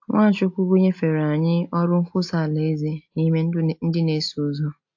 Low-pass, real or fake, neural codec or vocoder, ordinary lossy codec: 7.2 kHz; real; none; AAC, 48 kbps